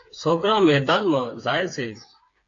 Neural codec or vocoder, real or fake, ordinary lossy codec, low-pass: codec, 16 kHz, 4 kbps, FreqCodec, smaller model; fake; AAC, 48 kbps; 7.2 kHz